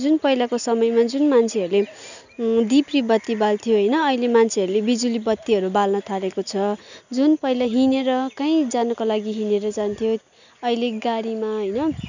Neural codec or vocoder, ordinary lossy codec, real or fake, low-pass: none; none; real; 7.2 kHz